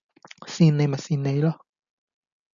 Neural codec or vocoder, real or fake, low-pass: none; real; 7.2 kHz